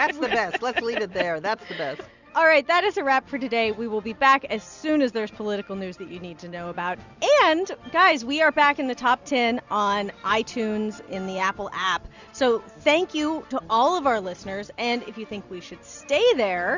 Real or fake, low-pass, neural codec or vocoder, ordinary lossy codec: real; 7.2 kHz; none; Opus, 64 kbps